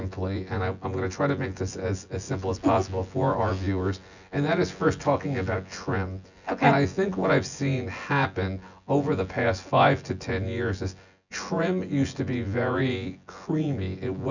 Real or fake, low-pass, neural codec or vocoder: fake; 7.2 kHz; vocoder, 24 kHz, 100 mel bands, Vocos